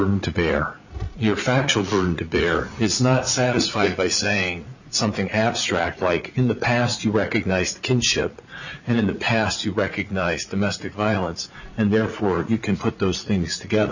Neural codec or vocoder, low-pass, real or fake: vocoder, 44.1 kHz, 80 mel bands, Vocos; 7.2 kHz; fake